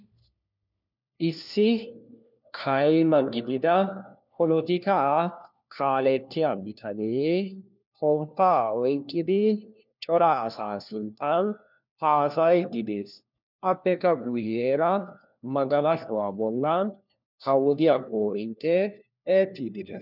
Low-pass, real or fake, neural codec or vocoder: 5.4 kHz; fake; codec, 16 kHz, 1 kbps, FunCodec, trained on LibriTTS, 50 frames a second